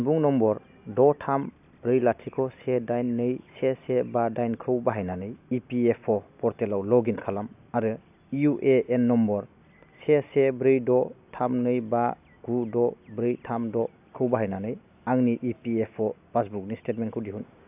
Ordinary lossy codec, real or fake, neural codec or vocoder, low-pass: AAC, 32 kbps; real; none; 3.6 kHz